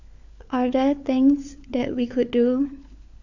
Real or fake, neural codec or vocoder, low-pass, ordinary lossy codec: fake; codec, 16 kHz, 4 kbps, FunCodec, trained on LibriTTS, 50 frames a second; 7.2 kHz; none